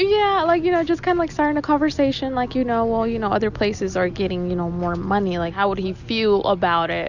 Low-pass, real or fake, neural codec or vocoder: 7.2 kHz; real; none